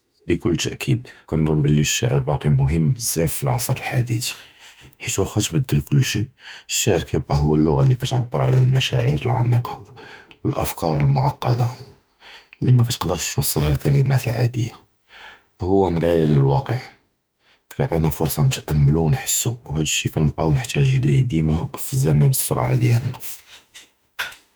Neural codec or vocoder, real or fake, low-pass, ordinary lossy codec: autoencoder, 48 kHz, 32 numbers a frame, DAC-VAE, trained on Japanese speech; fake; none; none